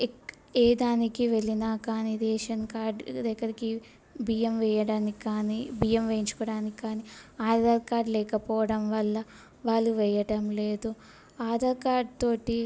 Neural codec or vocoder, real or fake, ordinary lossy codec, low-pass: none; real; none; none